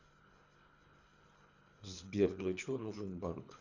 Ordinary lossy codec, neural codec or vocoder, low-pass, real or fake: none; codec, 24 kHz, 3 kbps, HILCodec; 7.2 kHz; fake